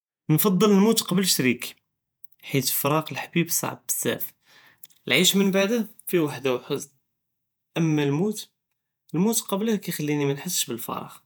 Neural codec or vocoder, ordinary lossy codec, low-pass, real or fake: vocoder, 48 kHz, 128 mel bands, Vocos; none; none; fake